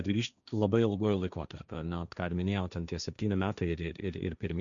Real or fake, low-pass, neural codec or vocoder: fake; 7.2 kHz; codec, 16 kHz, 1.1 kbps, Voila-Tokenizer